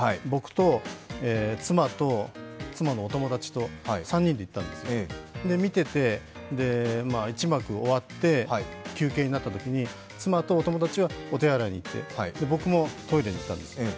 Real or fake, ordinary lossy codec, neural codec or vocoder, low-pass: real; none; none; none